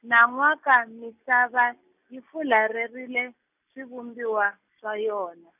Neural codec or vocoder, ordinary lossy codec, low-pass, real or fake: none; none; 3.6 kHz; real